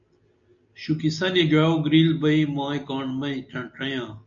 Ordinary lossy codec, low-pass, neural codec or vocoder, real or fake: MP3, 96 kbps; 7.2 kHz; none; real